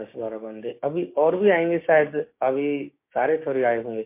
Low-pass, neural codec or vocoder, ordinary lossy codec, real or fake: 3.6 kHz; codec, 16 kHz, 6 kbps, DAC; MP3, 24 kbps; fake